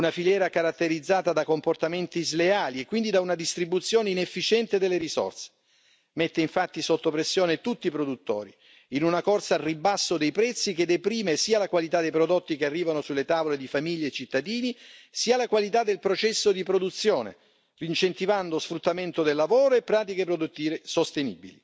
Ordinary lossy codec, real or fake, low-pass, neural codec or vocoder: none; real; none; none